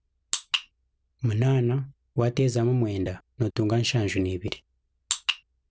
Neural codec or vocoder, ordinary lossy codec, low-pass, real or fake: none; none; none; real